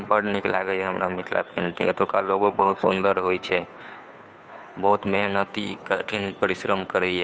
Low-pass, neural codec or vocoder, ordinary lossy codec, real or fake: none; codec, 16 kHz, 2 kbps, FunCodec, trained on Chinese and English, 25 frames a second; none; fake